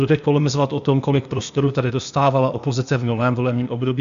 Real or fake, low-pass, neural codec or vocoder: fake; 7.2 kHz; codec, 16 kHz, 0.8 kbps, ZipCodec